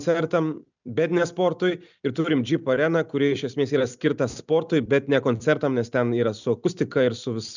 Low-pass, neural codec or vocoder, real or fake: 7.2 kHz; none; real